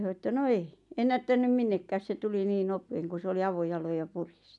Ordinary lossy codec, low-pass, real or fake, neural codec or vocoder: none; 10.8 kHz; real; none